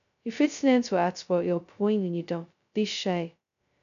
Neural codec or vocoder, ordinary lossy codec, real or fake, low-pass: codec, 16 kHz, 0.2 kbps, FocalCodec; none; fake; 7.2 kHz